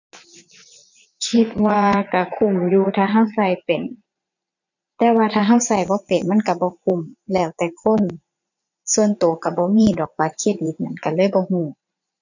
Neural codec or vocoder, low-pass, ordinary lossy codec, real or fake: vocoder, 22.05 kHz, 80 mel bands, Vocos; 7.2 kHz; none; fake